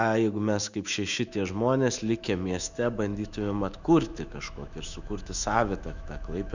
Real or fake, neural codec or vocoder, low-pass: fake; autoencoder, 48 kHz, 128 numbers a frame, DAC-VAE, trained on Japanese speech; 7.2 kHz